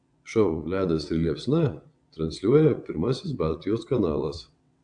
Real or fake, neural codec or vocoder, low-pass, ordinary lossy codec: fake; vocoder, 22.05 kHz, 80 mel bands, WaveNeXt; 9.9 kHz; AAC, 64 kbps